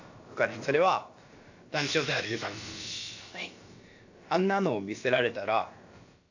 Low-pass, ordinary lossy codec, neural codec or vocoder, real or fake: 7.2 kHz; AAC, 48 kbps; codec, 16 kHz, about 1 kbps, DyCAST, with the encoder's durations; fake